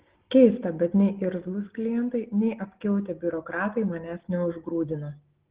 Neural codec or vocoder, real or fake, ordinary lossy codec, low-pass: none; real; Opus, 16 kbps; 3.6 kHz